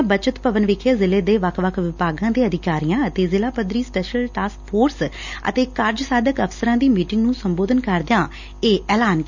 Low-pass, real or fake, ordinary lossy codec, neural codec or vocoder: 7.2 kHz; real; none; none